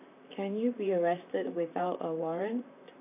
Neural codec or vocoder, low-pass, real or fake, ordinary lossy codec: vocoder, 44.1 kHz, 128 mel bands, Pupu-Vocoder; 3.6 kHz; fake; AAC, 32 kbps